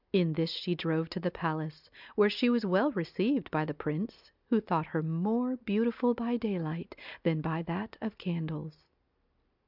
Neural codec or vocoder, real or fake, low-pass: none; real; 5.4 kHz